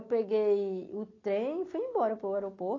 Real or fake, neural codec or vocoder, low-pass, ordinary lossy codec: real; none; 7.2 kHz; AAC, 32 kbps